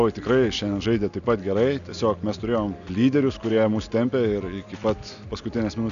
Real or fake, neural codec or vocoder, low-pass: real; none; 7.2 kHz